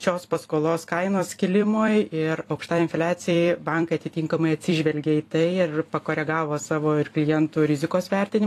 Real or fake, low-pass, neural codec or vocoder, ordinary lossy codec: fake; 14.4 kHz; vocoder, 44.1 kHz, 128 mel bands every 256 samples, BigVGAN v2; AAC, 48 kbps